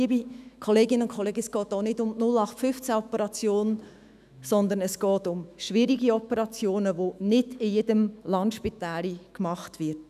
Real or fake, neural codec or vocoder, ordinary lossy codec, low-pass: fake; autoencoder, 48 kHz, 128 numbers a frame, DAC-VAE, trained on Japanese speech; none; 14.4 kHz